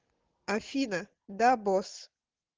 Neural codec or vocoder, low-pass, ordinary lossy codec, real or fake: none; 7.2 kHz; Opus, 16 kbps; real